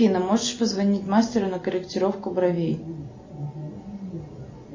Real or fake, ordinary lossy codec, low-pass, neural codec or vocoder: real; MP3, 32 kbps; 7.2 kHz; none